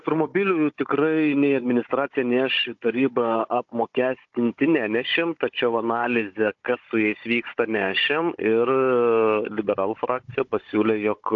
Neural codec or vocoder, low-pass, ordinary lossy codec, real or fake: codec, 16 kHz, 16 kbps, FunCodec, trained on Chinese and English, 50 frames a second; 7.2 kHz; AAC, 48 kbps; fake